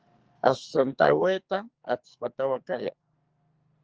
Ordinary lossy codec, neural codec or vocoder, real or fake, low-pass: Opus, 24 kbps; codec, 44.1 kHz, 2.6 kbps, SNAC; fake; 7.2 kHz